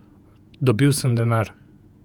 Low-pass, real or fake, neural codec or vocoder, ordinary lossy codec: 19.8 kHz; fake; vocoder, 48 kHz, 128 mel bands, Vocos; none